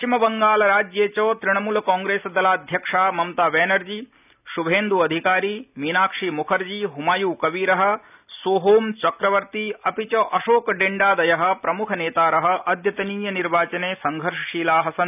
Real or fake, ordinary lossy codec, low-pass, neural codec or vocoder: real; none; 3.6 kHz; none